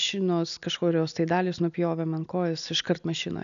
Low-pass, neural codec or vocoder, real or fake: 7.2 kHz; none; real